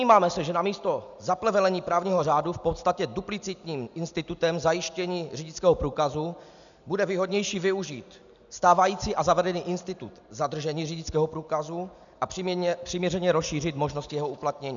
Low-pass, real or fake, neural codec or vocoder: 7.2 kHz; real; none